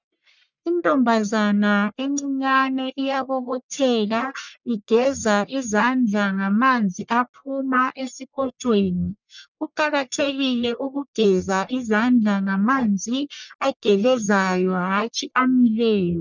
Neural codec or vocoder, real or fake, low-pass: codec, 44.1 kHz, 1.7 kbps, Pupu-Codec; fake; 7.2 kHz